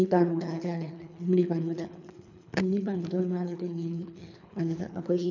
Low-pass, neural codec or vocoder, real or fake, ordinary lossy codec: 7.2 kHz; codec, 24 kHz, 3 kbps, HILCodec; fake; none